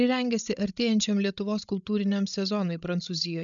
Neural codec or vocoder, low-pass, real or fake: codec, 16 kHz, 16 kbps, FreqCodec, larger model; 7.2 kHz; fake